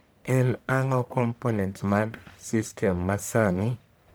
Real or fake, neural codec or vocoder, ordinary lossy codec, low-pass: fake; codec, 44.1 kHz, 1.7 kbps, Pupu-Codec; none; none